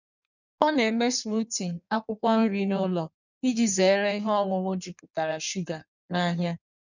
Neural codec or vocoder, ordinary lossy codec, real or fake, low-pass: codec, 16 kHz in and 24 kHz out, 1.1 kbps, FireRedTTS-2 codec; none; fake; 7.2 kHz